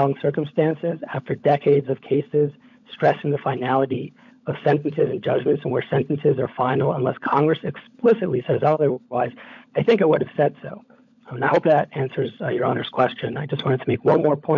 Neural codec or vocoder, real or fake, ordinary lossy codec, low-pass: codec, 16 kHz, 16 kbps, FunCodec, trained on LibriTTS, 50 frames a second; fake; MP3, 64 kbps; 7.2 kHz